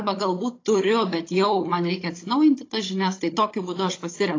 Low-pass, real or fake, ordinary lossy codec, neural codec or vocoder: 7.2 kHz; fake; AAC, 32 kbps; codec, 16 kHz, 16 kbps, FunCodec, trained on Chinese and English, 50 frames a second